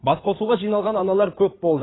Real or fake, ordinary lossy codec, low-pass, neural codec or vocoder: fake; AAC, 16 kbps; 7.2 kHz; codec, 16 kHz in and 24 kHz out, 2.2 kbps, FireRedTTS-2 codec